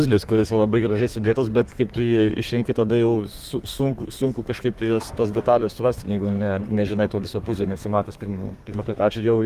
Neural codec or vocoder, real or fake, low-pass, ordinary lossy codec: codec, 32 kHz, 1.9 kbps, SNAC; fake; 14.4 kHz; Opus, 32 kbps